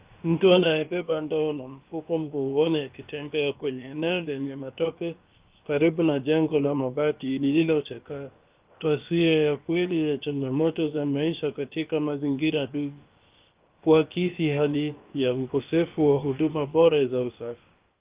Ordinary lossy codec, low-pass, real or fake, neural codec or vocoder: Opus, 32 kbps; 3.6 kHz; fake; codec, 16 kHz, about 1 kbps, DyCAST, with the encoder's durations